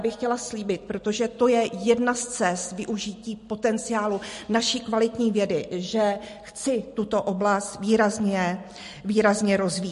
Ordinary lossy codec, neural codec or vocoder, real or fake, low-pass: MP3, 48 kbps; none; real; 14.4 kHz